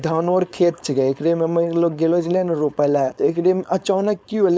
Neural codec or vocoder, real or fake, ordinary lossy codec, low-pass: codec, 16 kHz, 4.8 kbps, FACodec; fake; none; none